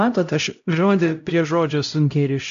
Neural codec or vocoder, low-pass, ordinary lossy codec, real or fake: codec, 16 kHz, 0.5 kbps, X-Codec, HuBERT features, trained on LibriSpeech; 7.2 kHz; AAC, 64 kbps; fake